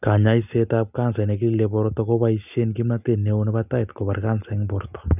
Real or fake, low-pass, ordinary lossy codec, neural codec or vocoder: real; 3.6 kHz; none; none